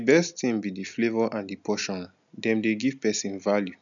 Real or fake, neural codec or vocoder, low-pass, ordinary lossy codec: real; none; 7.2 kHz; none